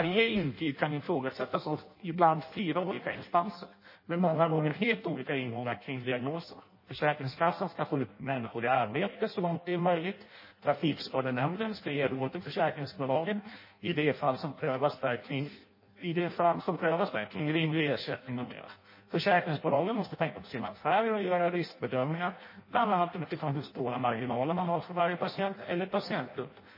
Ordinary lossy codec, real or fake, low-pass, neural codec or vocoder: MP3, 24 kbps; fake; 5.4 kHz; codec, 16 kHz in and 24 kHz out, 0.6 kbps, FireRedTTS-2 codec